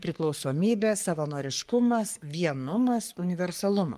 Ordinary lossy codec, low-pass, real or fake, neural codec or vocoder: Opus, 32 kbps; 14.4 kHz; fake; codec, 44.1 kHz, 3.4 kbps, Pupu-Codec